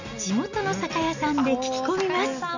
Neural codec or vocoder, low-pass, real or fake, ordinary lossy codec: none; 7.2 kHz; real; none